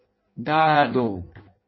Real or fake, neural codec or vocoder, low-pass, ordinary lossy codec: fake; codec, 16 kHz in and 24 kHz out, 0.6 kbps, FireRedTTS-2 codec; 7.2 kHz; MP3, 24 kbps